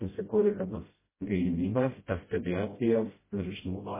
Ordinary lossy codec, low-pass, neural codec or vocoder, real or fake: MP3, 16 kbps; 3.6 kHz; codec, 16 kHz, 0.5 kbps, FreqCodec, smaller model; fake